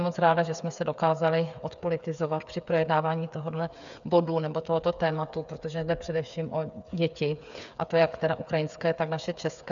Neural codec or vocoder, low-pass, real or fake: codec, 16 kHz, 8 kbps, FreqCodec, smaller model; 7.2 kHz; fake